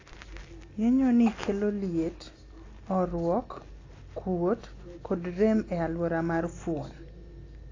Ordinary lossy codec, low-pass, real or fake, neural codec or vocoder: AAC, 32 kbps; 7.2 kHz; real; none